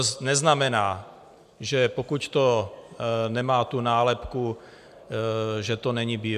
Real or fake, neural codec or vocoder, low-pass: real; none; 14.4 kHz